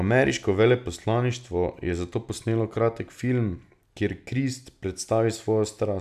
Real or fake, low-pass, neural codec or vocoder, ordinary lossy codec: real; 14.4 kHz; none; none